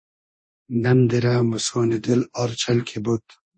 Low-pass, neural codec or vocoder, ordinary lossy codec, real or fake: 9.9 kHz; codec, 24 kHz, 0.9 kbps, DualCodec; MP3, 32 kbps; fake